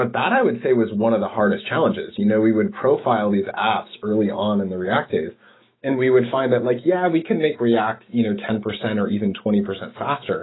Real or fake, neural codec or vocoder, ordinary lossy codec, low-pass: fake; autoencoder, 48 kHz, 128 numbers a frame, DAC-VAE, trained on Japanese speech; AAC, 16 kbps; 7.2 kHz